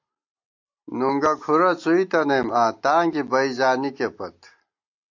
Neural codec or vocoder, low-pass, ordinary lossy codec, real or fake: none; 7.2 kHz; AAC, 48 kbps; real